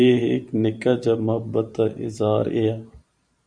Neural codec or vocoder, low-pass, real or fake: vocoder, 44.1 kHz, 128 mel bands every 512 samples, BigVGAN v2; 9.9 kHz; fake